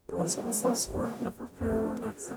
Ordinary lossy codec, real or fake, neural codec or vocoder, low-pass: none; fake; codec, 44.1 kHz, 0.9 kbps, DAC; none